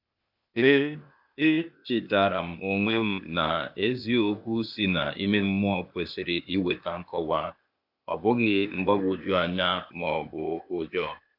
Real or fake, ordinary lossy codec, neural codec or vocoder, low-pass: fake; AAC, 48 kbps; codec, 16 kHz, 0.8 kbps, ZipCodec; 5.4 kHz